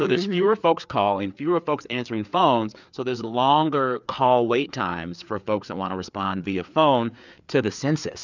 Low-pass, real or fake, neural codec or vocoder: 7.2 kHz; fake; codec, 16 kHz, 4 kbps, FreqCodec, larger model